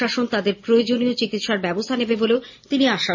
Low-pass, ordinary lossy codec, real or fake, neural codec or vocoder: 7.2 kHz; MP3, 32 kbps; real; none